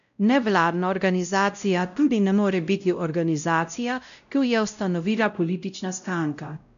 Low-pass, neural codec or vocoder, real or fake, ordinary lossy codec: 7.2 kHz; codec, 16 kHz, 0.5 kbps, X-Codec, WavLM features, trained on Multilingual LibriSpeech; fake; none